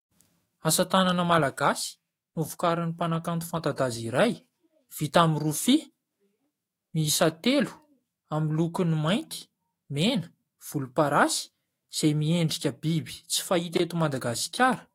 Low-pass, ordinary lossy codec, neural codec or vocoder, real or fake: 19.8 kHz; AAC, 48 kbps; autoencoder, 48 kHz, 128 numbers a frame, DAC-VAE, trained on Japanese speech; fake